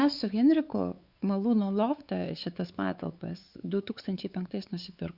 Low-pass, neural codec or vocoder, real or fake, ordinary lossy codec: 5.4 kHz; codec, 16 kHz, 4 kbps, X-Codec, WavLM features, trained on Multilingual LibriSpeech; fake; Opus, 64 kbps